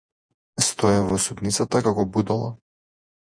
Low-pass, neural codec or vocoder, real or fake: 9.9 kHz; vocoder, 48 kHz, 128 mel bands, Vocos; fake